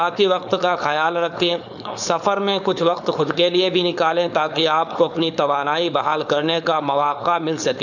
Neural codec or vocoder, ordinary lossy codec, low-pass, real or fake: codec, 16 kHz, 4.8 kbps, FACodec; none; 7.2 kHz; fake